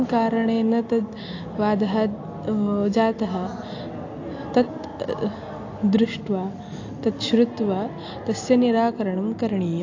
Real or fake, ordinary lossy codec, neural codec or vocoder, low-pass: real; AAC, 48 kbps; none; 7.2 kHz